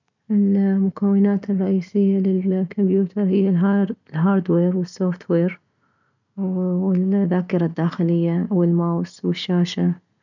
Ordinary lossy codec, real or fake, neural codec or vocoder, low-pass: none; real; none; 7.2 kHz